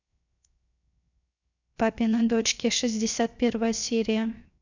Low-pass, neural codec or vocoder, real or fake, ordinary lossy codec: 7.2 kHz; codec, 16 kHz, 0.7 kbps, FocalCodec; fake; none